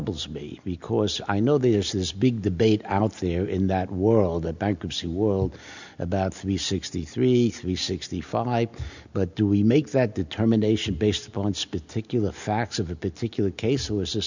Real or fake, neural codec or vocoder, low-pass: real; none; 7.2 kHz